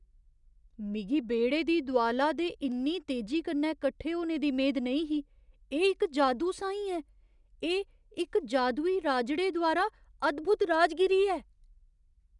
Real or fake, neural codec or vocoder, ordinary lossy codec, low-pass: real; none; none; 10.8 kHz